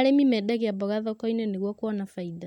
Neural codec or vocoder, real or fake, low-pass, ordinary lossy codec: none; real; 19.8 kHz; MP3, 96 kbps